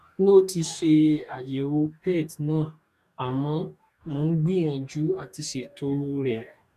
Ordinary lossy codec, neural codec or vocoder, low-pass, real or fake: none; codec, 44.1 kHz, 2.6 kbps, DAC; 14.4 kHz; fake